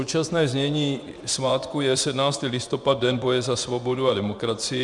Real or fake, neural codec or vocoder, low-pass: fake; vocoder, 24 kHz, 100 mel bands, Vocos; 10.8 kHz